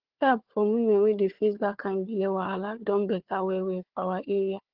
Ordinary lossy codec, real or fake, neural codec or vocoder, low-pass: Opus, 16 kbps; fake; codec, 16 kHz, 4 kbps, FunCodec, trained on Chinese and English, 50 frames a second; 5.4 kHz